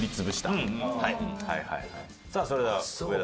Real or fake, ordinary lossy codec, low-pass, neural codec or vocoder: real; none; none; none